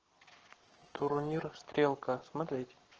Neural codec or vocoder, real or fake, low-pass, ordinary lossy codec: codec, 44.1 kHz, 7.8 kbps, Pupu-Codec; fake; 7.2 kHz; Opus, 16 kbps